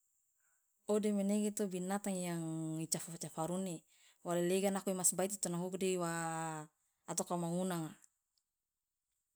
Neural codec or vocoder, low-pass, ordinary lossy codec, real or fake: none; none; none; real